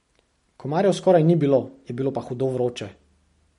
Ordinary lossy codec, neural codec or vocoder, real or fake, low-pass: MP3, 48 kbps; none; real; 10.8 kHz